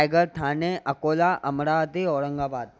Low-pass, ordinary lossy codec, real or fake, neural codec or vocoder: none; none; real; none